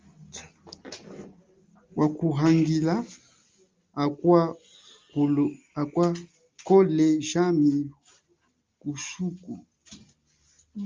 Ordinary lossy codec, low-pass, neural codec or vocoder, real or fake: Opus, 24 kbps; 7.2 kHz; none; real